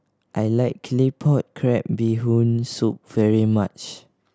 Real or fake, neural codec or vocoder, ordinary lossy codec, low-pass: real; none; none; none